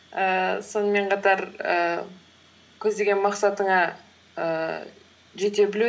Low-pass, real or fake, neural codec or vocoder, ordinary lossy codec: none; real; none; none